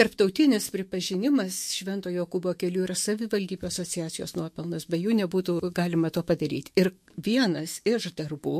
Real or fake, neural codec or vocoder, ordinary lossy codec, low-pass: fake; autoencoder, 48 kHz, 128 numbers a frame, DAC-VAE, trained on Japanese speech; MP3, 64 kbps; 14.4 kHz